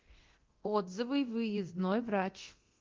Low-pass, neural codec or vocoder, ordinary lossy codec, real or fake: 7.2 kHz; codec, 24 kHz, 0.9 kbps, DualCodec; Opus, 16 kbps; fake